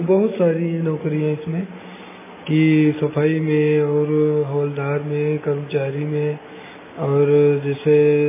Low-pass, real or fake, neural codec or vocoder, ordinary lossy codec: 3.6 kHz; real; none; MP3, 16 kbps